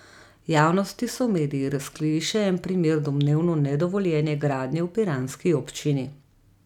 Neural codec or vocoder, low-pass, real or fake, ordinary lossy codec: none; 19.8 kHz; real; none